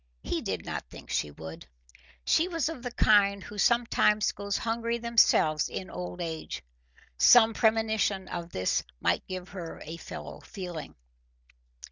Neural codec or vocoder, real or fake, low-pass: none; real; 7.2 kHz